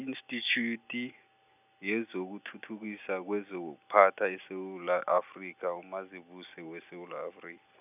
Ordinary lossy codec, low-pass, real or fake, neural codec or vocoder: none; 3.6 kHz; real; none